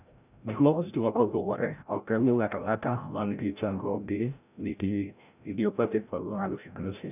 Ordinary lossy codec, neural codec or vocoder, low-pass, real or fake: none; codec, 16 kHz, 0.5 kbps, FreqCodec, larger model; 3.6 kHz; fake